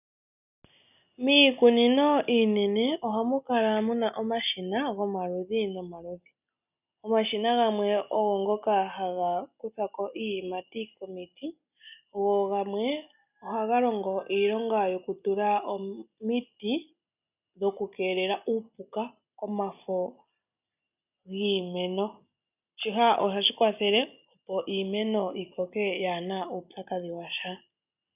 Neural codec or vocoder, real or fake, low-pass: none; real; 3.6 kHz